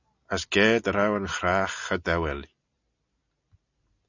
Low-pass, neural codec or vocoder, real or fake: 7.2 kHz; none; real